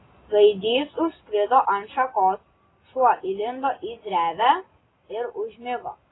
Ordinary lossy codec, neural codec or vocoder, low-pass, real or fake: AAC, 16 kbps; none; 7.2 kHz; real